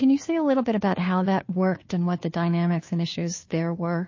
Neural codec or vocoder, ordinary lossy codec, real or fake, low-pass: codec, 16 kHz, 2 kbps, FunCodec, trained on Chinese and English, 25 frames a second; MP3, 32 kbps; fake; 7.2 kHz